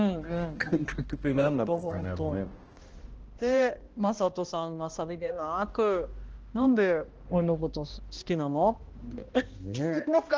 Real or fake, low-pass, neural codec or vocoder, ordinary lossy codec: fake; 7.2 kHz; codec, 16 kHz, 1 kbps, X-Codec, HuBERT features, trained on balanced general audio; Opus, 16 kbps